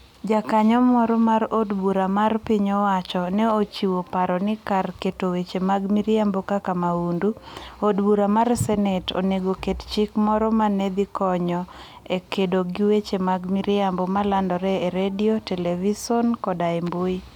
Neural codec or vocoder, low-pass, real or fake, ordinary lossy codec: none; 19.8 kHz; real; none